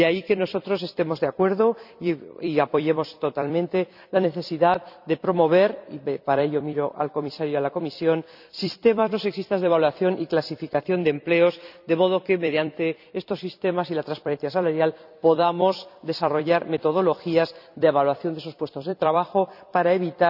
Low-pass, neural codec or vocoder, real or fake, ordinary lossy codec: 5.4 kHz; vocoder, 44.1 kHz, 128 mel bands every 256 samples, BigVGAN v2; fake; none